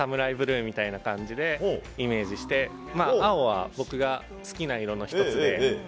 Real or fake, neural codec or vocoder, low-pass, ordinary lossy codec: real; none; none; none